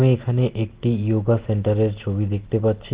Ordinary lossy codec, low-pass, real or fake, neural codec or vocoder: Opus, 16 kbps; 3.6 kHz; real; none